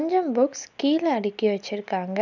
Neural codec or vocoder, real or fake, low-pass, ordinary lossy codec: none; real; 7.2 kHz; none